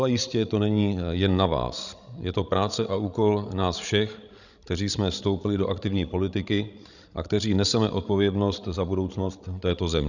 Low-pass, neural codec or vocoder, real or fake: 7.2 kHz; codec, 16 kHz, 16 kbps, FreqCodec, larger model; fake